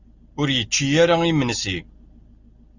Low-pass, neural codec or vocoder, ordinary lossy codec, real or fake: 7.2 kHz; none; Opus, 64 kbps; real